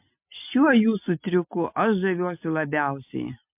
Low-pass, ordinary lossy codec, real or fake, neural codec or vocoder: 3.6 kHz; MP3, 32 kbps; real; none